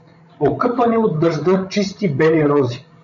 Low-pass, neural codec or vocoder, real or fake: 7.2 kHz; codec, 16 kHz, 16 kbps, FreqCodec, larger model; fake